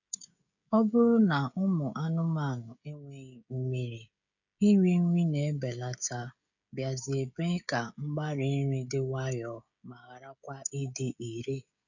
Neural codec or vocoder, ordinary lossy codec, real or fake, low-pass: codec, 16 kHz, 16 kbps, FreqCodec, smaller model; none; fake; 7.2 kHz